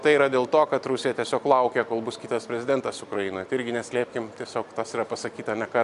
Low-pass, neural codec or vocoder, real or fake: 10.8 kHz; none; real